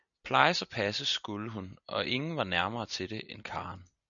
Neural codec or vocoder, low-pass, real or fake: none; 7.2 kHz; real